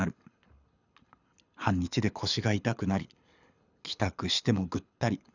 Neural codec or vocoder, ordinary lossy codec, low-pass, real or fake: codec, 24 kHz, 6 kbps, HILCodec; none; 7.2 kHz; fake